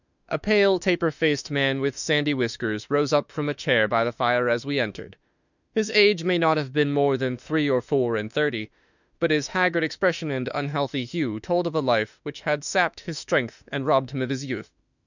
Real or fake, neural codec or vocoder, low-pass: fake; autoencoder, 48 kHz, 32 numbers a frame, DAC-VAE, trained on Japanese speech; 7.2 kHz